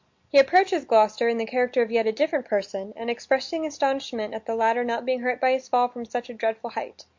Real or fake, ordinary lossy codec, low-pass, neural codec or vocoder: real; MP3, 48 kbps; 7.2 kHz; none